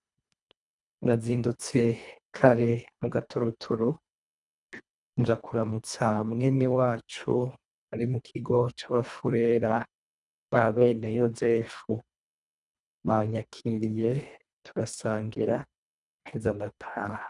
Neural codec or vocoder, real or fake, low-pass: codec, 24 kHz, 1.5 kbps, HILCodec; fake; 10.8 kHz